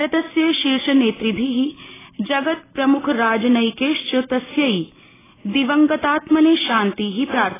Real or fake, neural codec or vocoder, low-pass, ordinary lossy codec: real; none; 3.6 kHz; AAC, 16 kbps